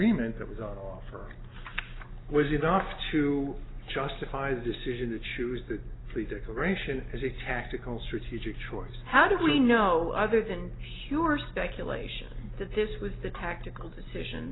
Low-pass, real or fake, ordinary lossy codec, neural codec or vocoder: 7.2 kHz; real; AAC, 16 kbps; none